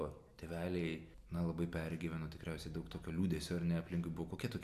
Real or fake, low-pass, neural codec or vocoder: real; 14.4 kHz; none